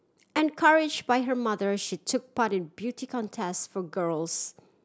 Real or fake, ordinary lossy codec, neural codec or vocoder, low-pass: real; none; none; none